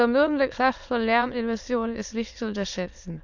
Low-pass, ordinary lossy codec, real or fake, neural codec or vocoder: 7.2 kHz; none; fake; autoencoder, 22.05 kHz, a latent of 192 numbers a frame, VITS, trained on many speakers